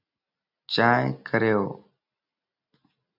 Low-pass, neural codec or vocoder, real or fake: 5.4 kHz; none; real